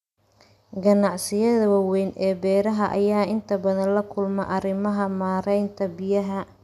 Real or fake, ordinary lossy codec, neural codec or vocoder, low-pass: real; none; none; 14.4 kHz